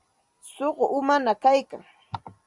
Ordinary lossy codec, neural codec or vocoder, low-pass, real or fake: Opus, 64 kbps; vocoder, 44.1 kHz, 128 mel bands every 256 samples, BigVGAN v2; 10.8 kHz; fake